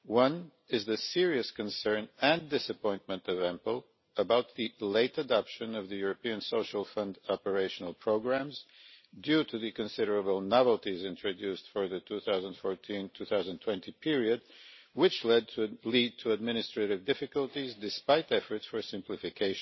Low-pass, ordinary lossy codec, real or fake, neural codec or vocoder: 7.2 kHz; MP3, 24 kbps; real; none